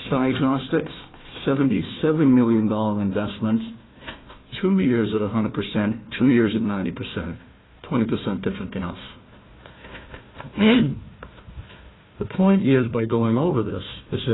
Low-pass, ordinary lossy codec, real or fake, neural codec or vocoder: 7.2 kHz; AAC, 16 kbps; fake; codec, 16 kHz, 1 kbps, FunCodec, trained on Chinese and English, 50 frames a second